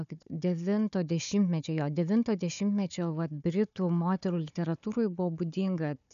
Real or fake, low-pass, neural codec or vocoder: fake; 7.2 kHz; codec, 16 kHz, 4 kbps, FunCodec, trained on Chinese and English, 50 frames a second